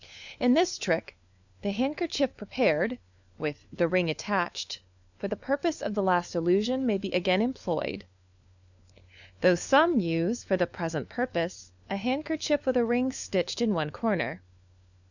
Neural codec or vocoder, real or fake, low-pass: codec, 16 kHz, 4 kbps, FunCodec, trained on LibriTTS, 50 frames a second; fake; 7.2 kHz